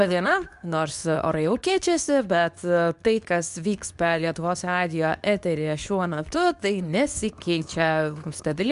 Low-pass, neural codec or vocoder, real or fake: 10.8 kHz; codec, 24 kHz, 0.9 kbps, WavTokenizer, medium speech release version 2; fake